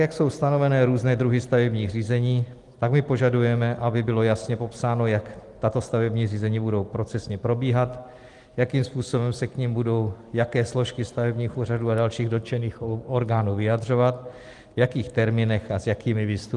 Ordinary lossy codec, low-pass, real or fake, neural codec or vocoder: Opus, 24 kbps; 10.8 kHz; real; none